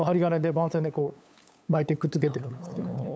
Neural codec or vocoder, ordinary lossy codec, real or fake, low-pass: codec, 16 kHz, 8 kbps, FunCodec, trained on LibriTTS, 25 frames a second; none; fake; none